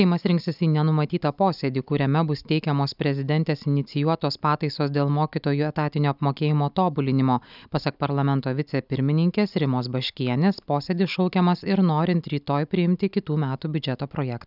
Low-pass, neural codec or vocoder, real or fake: 5.4 kHz; none; real